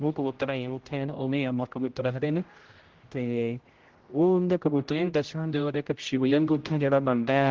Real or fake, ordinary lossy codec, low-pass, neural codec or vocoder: fake; Opus, 16 kbps; 7.2 kHz; codec, 16 kHz, 0.5 kbps, X-Codec, HuBERT features, trained on general audio